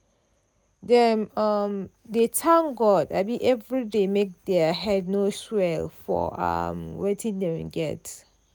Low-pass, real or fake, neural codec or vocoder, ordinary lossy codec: none; real; none; none